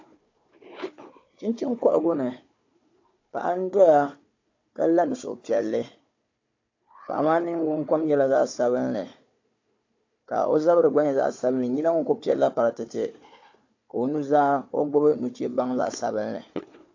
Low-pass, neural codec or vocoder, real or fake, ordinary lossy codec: 7.2 kHz; codec, 16 kHz, 4 kbps, FunCodec, trained on Chinese and English, 50 frames a second; fake; AAC, 48 kbps